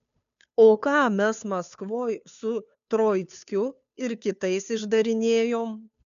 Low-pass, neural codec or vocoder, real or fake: 7.2 kHz; codec, 16 kHz, 2 kbps, FunCodec, trained on Chinese and English, 25 frames a second; fake